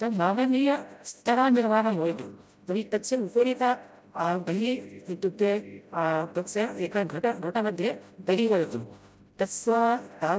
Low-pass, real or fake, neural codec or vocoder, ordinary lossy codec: none; fake; codec, 16 kHz, 0.5 kbps, FreqCodec, smaller model; none